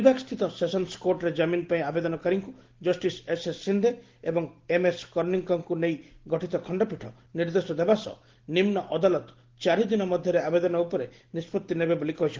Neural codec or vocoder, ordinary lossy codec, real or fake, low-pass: none; Opus, 16 kbps; real; 7.2 kHz